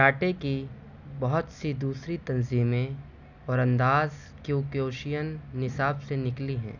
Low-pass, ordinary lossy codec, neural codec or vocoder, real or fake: 7.2 kHz; none; none; real